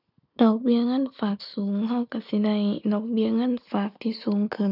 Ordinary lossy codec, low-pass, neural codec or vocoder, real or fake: none; 5.4 kHz; none; real